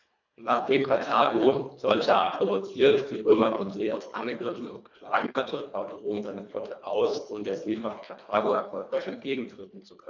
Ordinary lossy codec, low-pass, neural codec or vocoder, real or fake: MP3, 64 kbps; 7.2 kHz; codec, 24 kHz, 1.5 kbps, HILCodec; fake